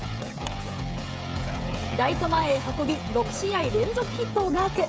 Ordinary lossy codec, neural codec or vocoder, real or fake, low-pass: none; codec, 16 kHz, 16 kbps, FreqCodec, smaller model; fake; none